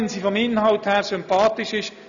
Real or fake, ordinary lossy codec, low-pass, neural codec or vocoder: real; none; 7.2 kHz; none